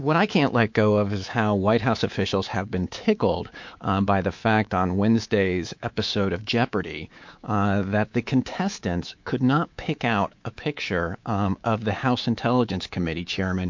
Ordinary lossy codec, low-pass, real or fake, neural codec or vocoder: MP3, 48 kbps; 7.2 kHz; fake; codec, 24 kHz, 3.1 kbps, DualCodec